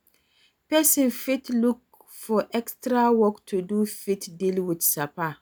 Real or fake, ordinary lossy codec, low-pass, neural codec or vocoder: real; none; none; none